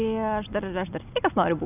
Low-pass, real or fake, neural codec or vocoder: 3.6 kHz; real; none